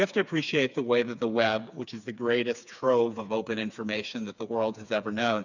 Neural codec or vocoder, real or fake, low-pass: codec, 16 kHz, 4 kbps, FreqCodec, smaller model; fake; 7.2 kHz